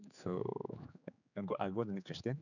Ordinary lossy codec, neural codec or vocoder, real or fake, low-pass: none; codec, 16 kHz, 4 kbps, X-Codec, HuBERT features, trained on general audio; fake; 7.2 kHz